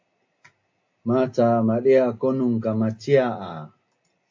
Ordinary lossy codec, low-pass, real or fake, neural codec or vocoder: AAC, 48 kbps; 7.2 kHz; real; none